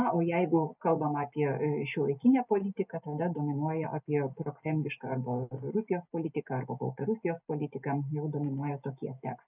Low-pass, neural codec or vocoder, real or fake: 3.6 kHz; none; real